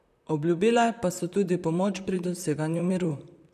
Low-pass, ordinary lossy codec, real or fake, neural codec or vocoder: 14.4 kHz; none; fake; vocoder, 44.1 kHz, 128 mel bands, Pupu-Vocoder